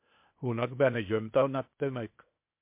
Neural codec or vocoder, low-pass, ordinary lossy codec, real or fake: codec, 16 kHz, 0.8 kbps, ZipCodec; 3.6 kHz; MP3, 32 kbps; fake